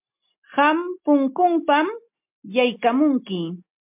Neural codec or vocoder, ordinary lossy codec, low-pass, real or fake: none; MP3, 32 kbps; 3.6 kHz; real